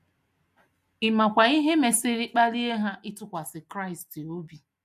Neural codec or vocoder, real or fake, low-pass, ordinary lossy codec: none; real; 14.4 kHz; MP3, 96 kbps